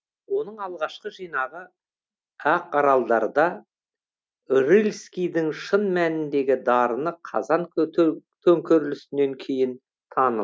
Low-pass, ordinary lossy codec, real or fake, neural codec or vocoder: none; none; real; none